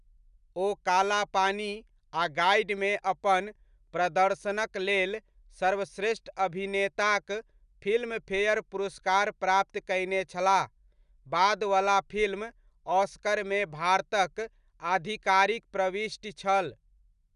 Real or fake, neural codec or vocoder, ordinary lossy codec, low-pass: real; none; none; 10.8 kHz